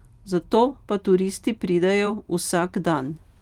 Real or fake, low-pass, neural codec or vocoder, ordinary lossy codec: fake; 19.8 kHz; vocoder, 44.1 kHz, 128 mel bands every 512 samples, BigVGAN v2; Opus, 32 kbps